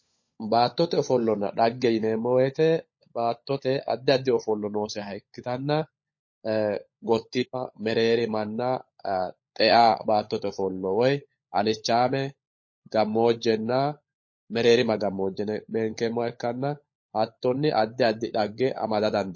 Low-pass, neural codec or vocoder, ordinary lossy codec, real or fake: 7.2 kHz; codec, 16 kHz, 16 kbps, FunCodec, trained on LibriTTS, 50 frames a second; MP3, 32 kbps; fake